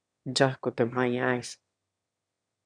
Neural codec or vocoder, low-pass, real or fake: autoencoder, 22.05 kHz, a latent of 192 numbers a frame, VITS, trained on one speaker; 9.9 kHz; fake